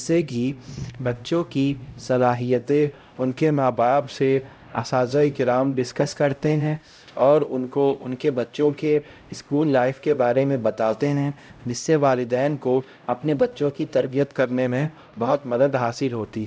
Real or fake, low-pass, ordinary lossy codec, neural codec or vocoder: fake; none; none; codec, 16 kHz, 0.5 kbps, X-Codec, HuBERT features, trained on LibriSpeech